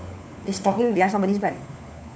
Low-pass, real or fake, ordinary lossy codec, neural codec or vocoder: none; fake; none; codec, 16 kHz, 4 kbps, FunCodec, trained on LibriTTS, 50 frames a second